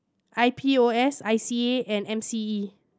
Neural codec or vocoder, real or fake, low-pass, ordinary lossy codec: none; real; none; none